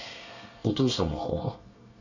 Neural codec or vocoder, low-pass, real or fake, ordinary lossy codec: codec, 24 kHz, 1 kbps, SNAC; 7.2 kHz; fake; none